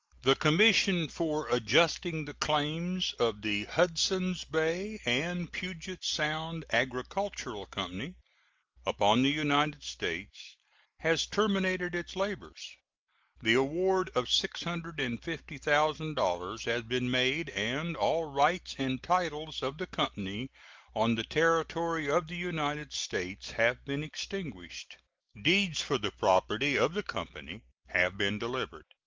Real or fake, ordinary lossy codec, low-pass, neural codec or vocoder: real; Opus, 32 kbps; 7.2 kHz; none